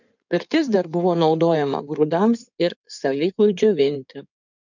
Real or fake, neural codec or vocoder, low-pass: fake; codec, 16 kHz in and 24 kHz out, 2.2 kbps, FireRedTTS-2 codec; 7.2 kHz